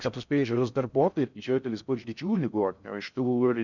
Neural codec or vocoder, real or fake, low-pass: codec, 16 kHz in and 24 kHz out, 0.6 kbps, FocalCodec, streaming, 4096 codes; fake; 7.2 kHz